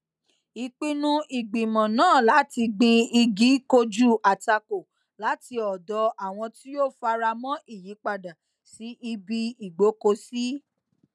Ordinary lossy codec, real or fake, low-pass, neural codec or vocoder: none; real; none; none